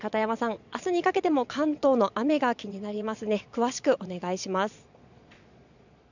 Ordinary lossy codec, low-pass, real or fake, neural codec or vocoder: none; 7.2 kHz; fake; vocoder, 22.05 kHz, 80 mel bands, Vocos